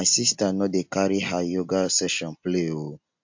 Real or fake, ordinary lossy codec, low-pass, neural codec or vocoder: real; MP3, 48 kbps; 7.2 kHz; none